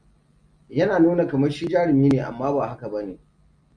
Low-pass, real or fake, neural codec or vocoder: 9.9 kHz; real; none